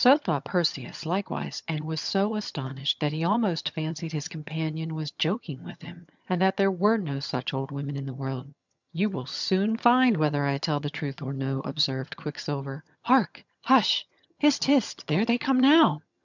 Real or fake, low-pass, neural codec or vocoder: fake; 7.2 kHz; vocoder, 22.05 kHz, 80 mel bands, HiFi-GAN